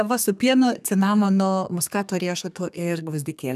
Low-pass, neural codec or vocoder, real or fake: 14.4 kHz; codec, 32 kHz, 1.9 kbps, SNAC; fake